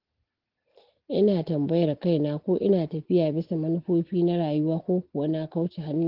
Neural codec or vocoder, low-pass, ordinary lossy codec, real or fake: none; 5.4 kHz; Opus, 16 kbps; real